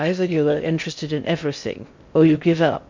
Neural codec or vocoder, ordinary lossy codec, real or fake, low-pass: codec, 16 kHz in and 24 kHz out, 0.6 kbps, FocalCodec, streaming, 2048 codes; MP3, 48 kbps; fake; 7.2 kHz